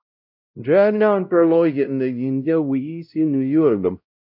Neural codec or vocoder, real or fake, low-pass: codec, 16 kHz, 0.5 kbps, X-Codec, WavLM features, trained on Multilingual LibriSpeech; fake; 5.4 kHz